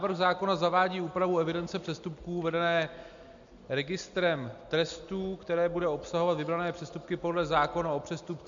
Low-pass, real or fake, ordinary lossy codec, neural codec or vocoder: 7.2 kHz; real; MP3, 64 kbps; none